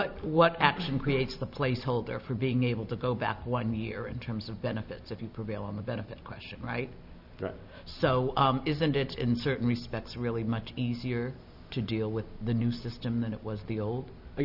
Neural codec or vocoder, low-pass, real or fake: none; 5.4 kHz; real